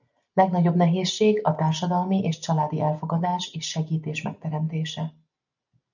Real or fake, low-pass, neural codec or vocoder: real; 7.2 kHz; none